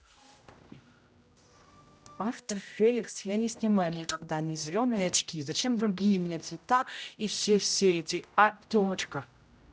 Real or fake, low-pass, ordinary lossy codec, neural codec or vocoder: fake; none; none; codec, 16 kHz, 0.5 kbps, X-Codec, HuBERT features, trained on general audio